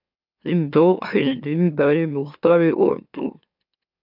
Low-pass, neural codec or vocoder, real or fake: 5.4 kHz; autoencoder, 44.1 kHz, a latent of 192 numbers a frame, MeloTTS; fake